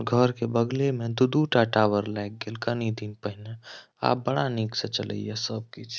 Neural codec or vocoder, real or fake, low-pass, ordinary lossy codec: none; real; none; none